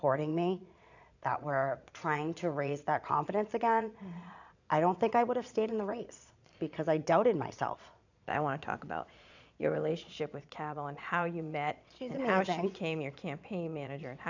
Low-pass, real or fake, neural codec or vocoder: 7.2 kHz; fake; vocoder, 22.05 kHz, 80 mel bands, Vocos